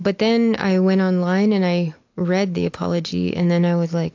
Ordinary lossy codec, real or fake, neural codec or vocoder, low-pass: AAC, 48 kbps; real; none; 7.2 kHz